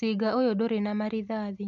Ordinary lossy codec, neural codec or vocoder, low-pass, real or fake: none; none; 7.2 kHz; real